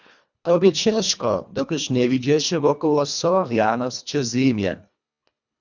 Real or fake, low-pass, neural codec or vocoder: fake; 7.2 kHz; codec, 24 kHz, 1.5 kbps, HILCodec